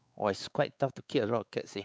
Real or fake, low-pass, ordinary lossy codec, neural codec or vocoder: fake; none; none; codec, 16 kHz, 4 kbps, X-Codec, WavLM features, trained on Multilingual LibriSpeech